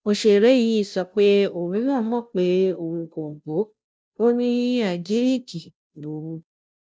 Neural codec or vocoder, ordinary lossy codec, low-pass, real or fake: codec, 16 kHz, 0.5 kbps, FunCodec, trained on Chinese and English, 25 frames a second; none; none; fake